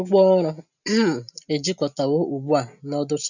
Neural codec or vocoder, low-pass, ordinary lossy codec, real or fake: none; 7.2 kHz; none; real